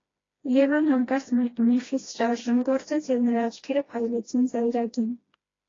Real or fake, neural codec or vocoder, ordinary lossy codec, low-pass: fake; codec, 16 kHz, 1 kbps, FreqCodec, smaller model; AAC, 32 kbps; 7.2 kHz